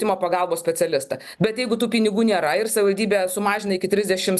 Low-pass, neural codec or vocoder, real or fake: 14.4 kHz; none; real